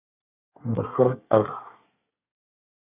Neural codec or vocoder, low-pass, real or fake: codec, 24 kHz, 1 kbps, SNAC; 3.6 kHz; fake